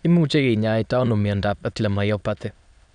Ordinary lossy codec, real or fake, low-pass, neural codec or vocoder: none; fake; 9.9 kHz; autoencoder, 22.05 kHz, a latent of 192 numbers a frame, VITS, trained on many speakers